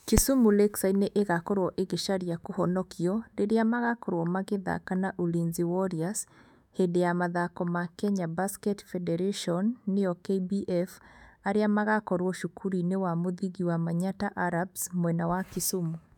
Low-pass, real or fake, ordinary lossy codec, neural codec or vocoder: 19.8 kHz; fake; none; autoencoder, 48 kHz, 128 numbers a frame, DAC-VAE, trained on Japanese speech